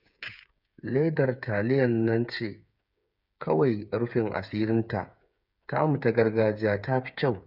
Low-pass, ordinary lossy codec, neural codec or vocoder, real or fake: 5.4 kHz; none; codec, 16 kHz, 8 kbps, FreqCodec, smaller model; fake